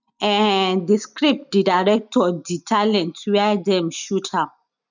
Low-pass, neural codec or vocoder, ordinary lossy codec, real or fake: 7.2 kHz; none; none; real